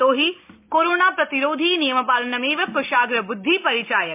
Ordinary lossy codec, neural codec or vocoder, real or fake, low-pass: MP3, 32 kbps; none; real; 3.6 kHz